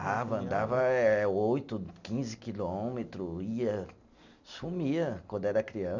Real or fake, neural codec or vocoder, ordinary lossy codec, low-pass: real; none; none; 7.2 kHz